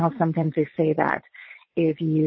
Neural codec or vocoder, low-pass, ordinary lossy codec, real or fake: vocoder, 22.05 kHz, 80 mel bands, Vocos; 7.2 kHz; MP3, 24 kbps; fake